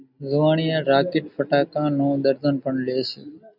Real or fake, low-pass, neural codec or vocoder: real; 5.4 kHz; none